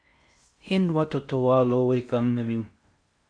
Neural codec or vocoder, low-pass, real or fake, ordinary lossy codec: codec, 16 kHz in and 24 kHz out, 0.6 kbps, FocalCodec, streaming, 4096 codes; 9.9 kHz; fake; AAC, 64 kbps